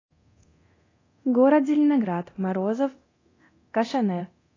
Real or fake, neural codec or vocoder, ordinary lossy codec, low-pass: fake; codec, 24 kHz, 0.9 kbps, DualCodec; AAC, 32 kbps; 7.2 kHz